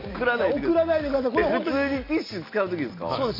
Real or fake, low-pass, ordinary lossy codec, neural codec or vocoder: real; 5.4 kHz; MP3, 32 kbps; none